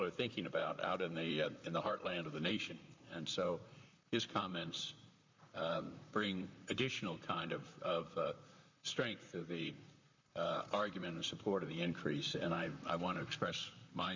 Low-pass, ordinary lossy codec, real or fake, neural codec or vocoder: 7.2 kHz; MP3, 64 kbps; fake; vocoder, 44.1 kHz, 128 mel bands, Pupu-Vocoder